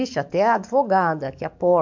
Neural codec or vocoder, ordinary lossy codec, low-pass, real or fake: codec, 44.1 kHz, 7.8 kbps, DAC; MP3, 64 kbps; 7.2 kHz; fake